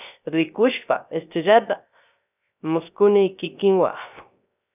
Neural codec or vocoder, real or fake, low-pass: codec, 16 kHz, 0.3 kbps, FocalCodec; fake; 3.6 kHz